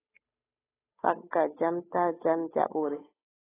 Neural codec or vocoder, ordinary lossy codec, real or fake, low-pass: codec, 16 kHz, 8 kbps, FunCodec, trained on Chinese and English, 25 frames a second; AAC, 16 kbps; fake; 3.6 kHz